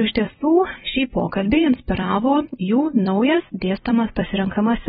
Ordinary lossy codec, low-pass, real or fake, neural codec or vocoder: AAC, 16 kbps; 19.8 kHz; fake; vocoder, 44.1 kHz, 128 mel bands every 256 samples, BigVGAN v2